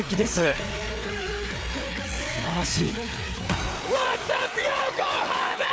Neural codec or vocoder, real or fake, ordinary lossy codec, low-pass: codec, 16 kHz, 4 kbps, FreqCodec, larger model; fake; none; none